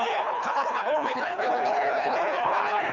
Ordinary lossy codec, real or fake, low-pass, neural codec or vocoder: none; fake; 7.2 kHz; codec, 24 kHz, 3 kbps, HILCodec